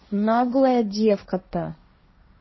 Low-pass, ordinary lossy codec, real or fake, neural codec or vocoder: 7.2 kHz; MP3, 24 kbps; fake; codec, 16 kHz, 1.1 kbps, Voila-Tokenizer